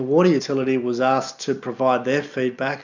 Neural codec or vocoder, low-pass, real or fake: none; 7.2 kHz; real